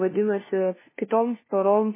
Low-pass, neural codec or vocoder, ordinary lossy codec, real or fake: 3.6 kHz; codec, 16 kHz, 1 kbps, FunCodec, trained on LibriTTS, 50 frames a second; MP3, 16 kbps; fake